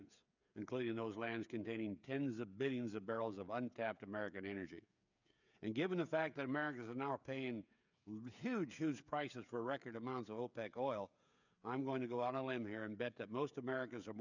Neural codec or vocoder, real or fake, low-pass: codec, 16 kHz, 8 kbps, FreqCodec, smaller model; fake; 7.2 kHz